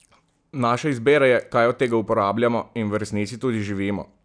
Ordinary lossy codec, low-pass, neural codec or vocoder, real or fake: none; 9.9 kHz; none; real